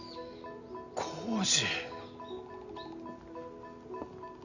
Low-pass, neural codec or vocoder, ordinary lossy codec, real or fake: 7.2 kHz; none; none; real